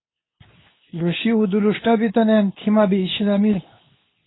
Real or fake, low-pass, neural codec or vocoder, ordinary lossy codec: fake; 7.2 kHz; codec, 24 kHz, 0.9 kbps, WavTokenizer, medium speech release version 2; AAC, 16 kbps